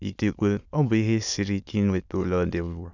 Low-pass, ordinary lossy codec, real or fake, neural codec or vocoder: 7.2 kHz; none; fake; autoencoder, 22.05 kHz, a latent of 192 numbers a frame, VITS, trained on many speakers